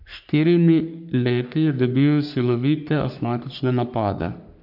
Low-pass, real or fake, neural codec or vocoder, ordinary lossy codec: 5.4 kHz; fake; codec, 44.1 kHz, 3.4 kbps, Pupu-Codec; none